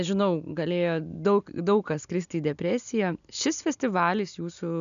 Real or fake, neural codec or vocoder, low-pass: real; none; 7.2 kHz